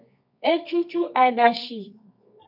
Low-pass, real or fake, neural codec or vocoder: 5.4 kHz; fake; codec, 24 kHz, 0.9 kbps, WavTokenizer, medium music audio release